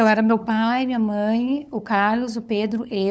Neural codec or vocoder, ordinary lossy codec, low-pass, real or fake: codec, 16 kHz, 8 kbps, FunCodec, trained on LibriTTS, 25 frames a second; none; none; fake